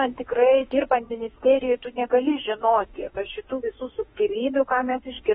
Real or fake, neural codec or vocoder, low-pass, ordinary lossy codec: fake; autoencoder, 48 kHz, 32 numbers a frame, DAC-VAE, trained on Japanese speech; 19.8 kHz; AAC, 16 kbps